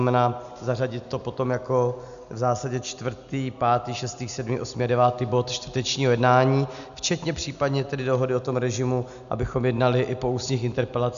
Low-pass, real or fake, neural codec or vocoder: 7.2 kHz; real; none